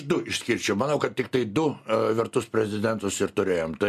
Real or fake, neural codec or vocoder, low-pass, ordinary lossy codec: real; none; 14.4 kHz; MP3, 96 kbps